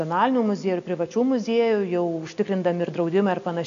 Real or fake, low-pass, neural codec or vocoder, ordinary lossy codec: real; 7.2 kHz; none; MP3, 64 kbps